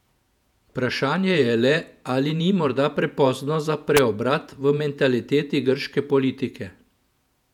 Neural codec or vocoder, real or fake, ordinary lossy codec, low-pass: vocoder, 48 kHz, 128 mel bands, Vocos; fake; none; 19.8 kHz